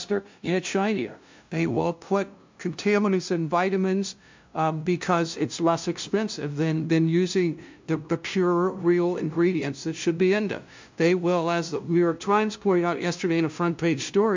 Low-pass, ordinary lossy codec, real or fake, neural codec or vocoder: 7.2 kHz; MP3, 64 kbps; fake; codec, 16 kHz, 0.5 kbps, FunCodec, trained on LibriTTS, 25 frames a second